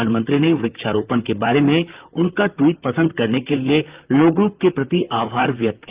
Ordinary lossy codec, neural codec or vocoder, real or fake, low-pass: Opus, 24 kbps; vocoder, 44.1 kHz, 128 mel bands, Pupu-Vocoder; fake; 3.6 kHz